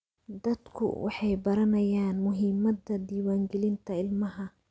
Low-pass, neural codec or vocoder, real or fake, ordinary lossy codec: none; none; real; none